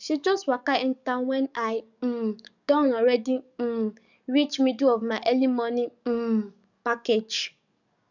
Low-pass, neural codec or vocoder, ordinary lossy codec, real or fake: 7.2 kHz; codec, 44.1 kHz, 7.8 kbps, DAC; none; fake